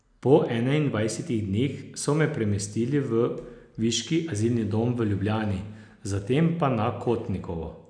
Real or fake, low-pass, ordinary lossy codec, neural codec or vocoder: real; 9.9 kHz; none; none